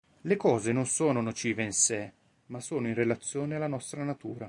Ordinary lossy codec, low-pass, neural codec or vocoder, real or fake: MP3, 64 kbps; 10.8 kHz; none; real